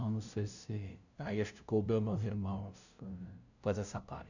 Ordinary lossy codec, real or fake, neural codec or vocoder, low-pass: none; fake; codec, 16 kHz, 0.5 kbps, FunCodec, trained on LibriTTS, 25 frames a second; 7.2 kHz